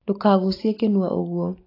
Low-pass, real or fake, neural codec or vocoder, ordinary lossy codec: 5.4 kHz; real; none; AAC, 24 kbps